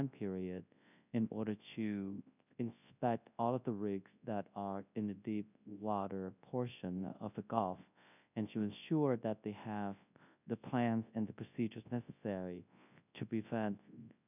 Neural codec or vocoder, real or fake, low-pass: codec, 24 kHz, 0.9 kbps, WavTokenizer, large speech release; fake; 3.6 kHz